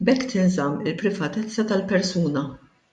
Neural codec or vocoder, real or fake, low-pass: none; real; 10.8 kHz